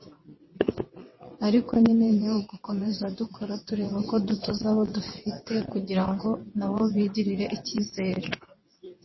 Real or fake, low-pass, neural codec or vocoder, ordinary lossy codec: fake; 7.2 kHz; vocoder, 22.05 kHz, 80 mel bands, WaveNeXt; MP3, 24 kbps